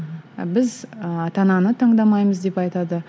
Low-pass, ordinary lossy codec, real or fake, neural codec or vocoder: none; none; real; none